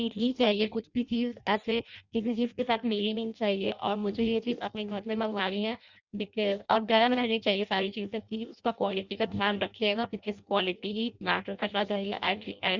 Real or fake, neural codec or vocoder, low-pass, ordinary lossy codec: fake; codec, 16 kHz in and 24 kHz out, 0.6 kbps, FireRedTTS-2 codec; 7.2 kHz; Opus, 64 kbps